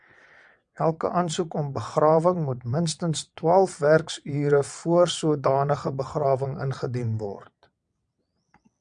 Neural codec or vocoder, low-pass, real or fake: vocoder, 22.05 kHz, 80 mel bands, WaveNeXt; 9.9 kHz; fake